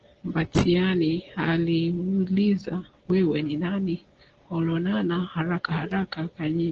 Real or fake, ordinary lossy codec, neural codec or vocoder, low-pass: real; Opus, 16 kbps; none; 7.2 kHz